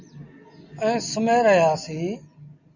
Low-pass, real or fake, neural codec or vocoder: 7.2 kHz; real; none